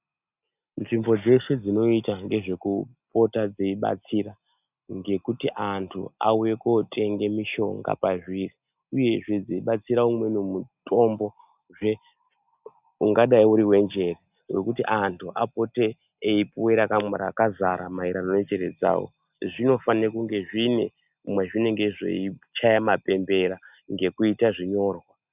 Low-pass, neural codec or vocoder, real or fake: 3.6 kHz; none; real